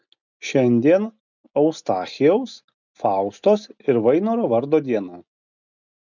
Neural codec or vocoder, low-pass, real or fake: none; 7.2 kHz; real